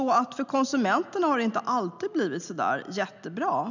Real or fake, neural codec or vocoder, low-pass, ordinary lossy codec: real; none; 7.2 kHz; none